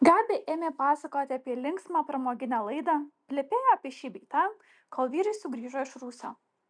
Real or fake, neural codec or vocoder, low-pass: real; none; 9.9 kHz